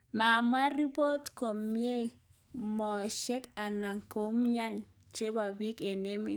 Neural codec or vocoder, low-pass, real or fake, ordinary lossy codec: codec, 44.1 kHz, 2.6 kbps, SNAC; none; fake; none